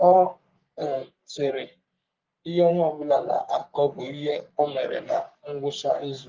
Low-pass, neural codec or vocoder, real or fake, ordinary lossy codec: 7.2 kHz; codec, 44.1 kHz, 3.4 kbps, Pupu-Codec; fake; Opus, 32 kbps